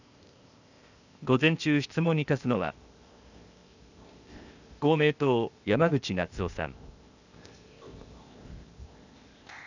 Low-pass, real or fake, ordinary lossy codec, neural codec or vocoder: 7.2 kHz; fake; none; codec, 16 kHz, 0.8 kbps, ZipCodec